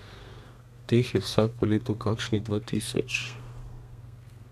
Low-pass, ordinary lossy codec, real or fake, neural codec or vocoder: 14.4 kHz; none; fake; codec, 32 kHz, 1.9 kbps, SNAC